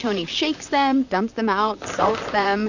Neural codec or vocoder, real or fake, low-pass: vocoder, 44.1 kHz, 128 mel bands, Pupu-Vocoder; fake; 7.2 kHz